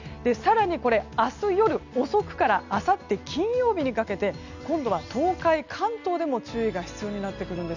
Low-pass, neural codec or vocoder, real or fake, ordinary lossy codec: 7.2 kHz; none; real; none